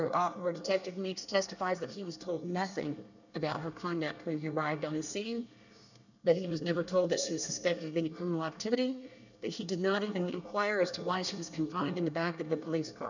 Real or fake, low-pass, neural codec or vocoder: fake; 7.2 kHz; codec, 24 kHz, 1 kbps, SNAC